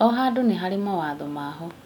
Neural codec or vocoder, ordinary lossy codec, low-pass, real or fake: none; none; 19.8 kHz; real